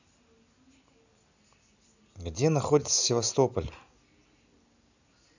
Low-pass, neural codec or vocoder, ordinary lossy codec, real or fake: 7.2 kHz; none; none; real